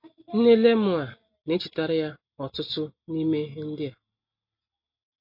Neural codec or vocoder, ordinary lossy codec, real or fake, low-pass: none; MP3, 32 kbps; real; 5.4 kHz